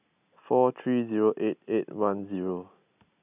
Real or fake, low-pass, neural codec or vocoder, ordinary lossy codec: real; 3.6 kHz; none; none